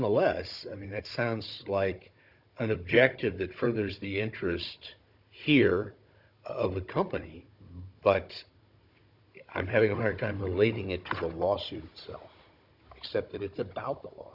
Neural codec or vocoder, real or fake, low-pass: codec, 16 kHz, 16 kbps, FunCodec, trained on Chinese and English, 50 frames a second; fake; 5.4 kHz